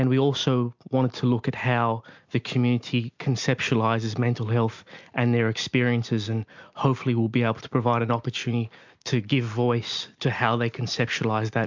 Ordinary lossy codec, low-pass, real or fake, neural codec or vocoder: MP3, 64 kbps; 7.2 kHz; real; none